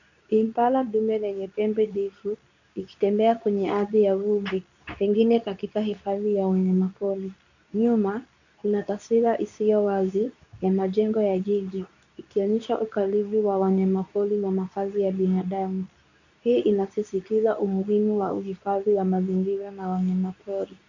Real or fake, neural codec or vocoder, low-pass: fake; codec, 24 kHz, 0.9 kbps, WavTokenizer, medium speech release version 2; 7.2 kHz